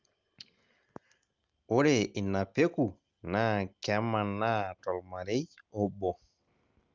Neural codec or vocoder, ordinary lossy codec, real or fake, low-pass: none; Opus, 24 kbps; real; 7.2 kHz